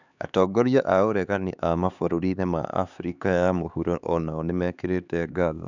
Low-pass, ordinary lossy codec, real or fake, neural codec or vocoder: 7.2 kHz; none; fake; codec, 16 kHz, 4 kbps, X-Codec, HuBERT features, trained on LibriSpeech